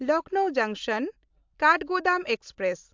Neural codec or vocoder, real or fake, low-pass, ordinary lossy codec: none; real; 7.2 kHz; MP3, 64 kbps